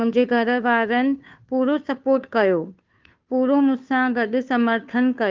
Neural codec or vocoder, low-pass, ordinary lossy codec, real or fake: codec, 16 kHz, 2 kbps, FunCodec, trained on Chinese and English, 25 frames a second; 7.2 kHz; Opus, 32 kbps; fake